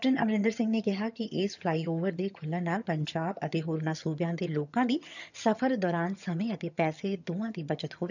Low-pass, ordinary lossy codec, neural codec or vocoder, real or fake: 7.2 kHz; none; vocoder, 22.05 kHz, 80 mel bands, HiFi-GAN; fake